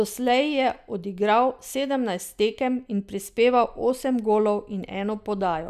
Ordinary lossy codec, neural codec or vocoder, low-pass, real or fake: none; none; 14.4 kHz; real